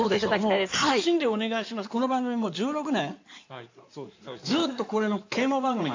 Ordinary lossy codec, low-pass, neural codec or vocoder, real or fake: AAC, 48 kbps; 7.2 kHz; codec, 16 kHz in and 24 kHz out, 2.2 kbps, FireRedTTS-2 codec; fake